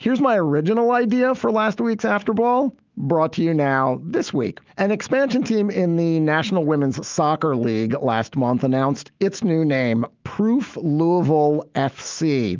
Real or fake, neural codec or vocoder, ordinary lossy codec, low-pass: fake; autoencoder, 48 kHz, 128 numbers a frame, DAC-VAE, trained on Japanese speech; Opus, 24 kbps; 7.2 kHz